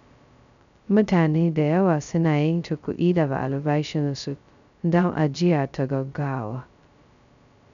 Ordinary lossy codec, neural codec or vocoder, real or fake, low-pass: MP3, 96 kbps; codec, 16 kHz, 0.2 kbps, FocalCodec; fake; 7.2 kHz